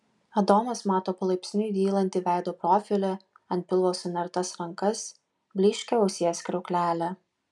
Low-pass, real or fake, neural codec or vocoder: 10.8 kHz; real; none